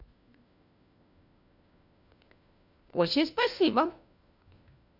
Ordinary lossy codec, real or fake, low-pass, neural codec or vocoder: none; fake; 5.4 kHz; codec, 16 kHz, 1 kbps, FunCodec, trained on LibriTTS, 50 frames a second